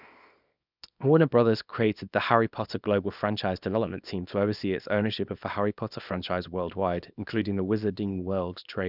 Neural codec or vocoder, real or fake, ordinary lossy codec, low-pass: codec, 24 kHz, 0.9 kbps, WavTokenizer, small release; fake; none; 5.4 kHz